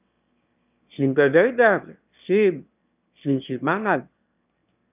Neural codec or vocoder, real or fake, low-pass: autoencoder, 22.05 kHz, a latent of 192 numbers a frame, VITS, trained on one speaker; fake; 3.6 kHz